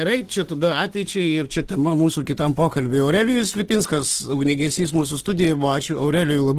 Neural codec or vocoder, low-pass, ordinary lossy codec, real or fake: autoencoder, 48 kHz, 32 numbers a frame, DAC-VAE, trained on Japanese speech; 14.4 kHz; Opus, 16 kbps; fake